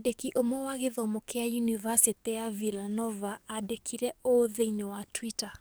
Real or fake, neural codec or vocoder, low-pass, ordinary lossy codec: fake; codec, 44.1 kHz, 7.8 kbps, DAC; none; none